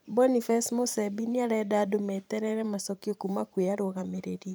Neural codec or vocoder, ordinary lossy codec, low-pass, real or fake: vocoder, 44.1 kHz, 128 mel bands every 512 samples, BigVGAN v2; none; none; fake